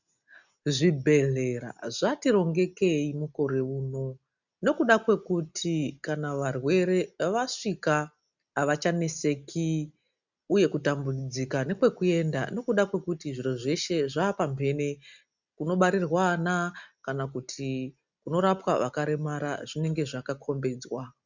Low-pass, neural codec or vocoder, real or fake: 7.2 kHz; none; real